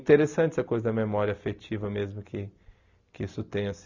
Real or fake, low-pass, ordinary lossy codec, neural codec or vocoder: real; 7.2 kHz; none; none